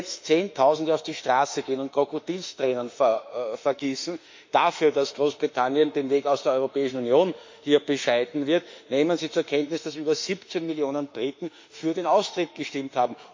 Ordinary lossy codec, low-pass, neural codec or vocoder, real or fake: MP3, 48 kbps; 7.2 kHz; autoencoder, 48 kHz, 32 numbers a frame, DAC-VAE, trained on Japanese speech; fake